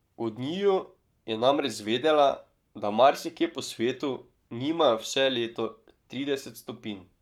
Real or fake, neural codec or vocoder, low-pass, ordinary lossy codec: fake; codec, 44.1 kHz, 7.8 kbps, Pupu-Codec; 19.8 kHz; none